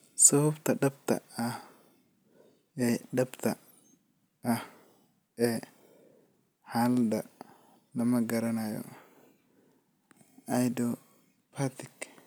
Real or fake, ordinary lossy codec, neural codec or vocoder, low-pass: real; none; none; none